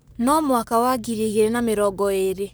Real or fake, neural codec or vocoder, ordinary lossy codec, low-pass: fake; codec, 44.1 kHz, 7.8 kbps, Pupu-Codec; none; none